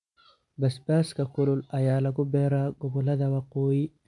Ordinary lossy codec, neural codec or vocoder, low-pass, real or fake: MP3, 64 kbps; none; 10.8 kHz; real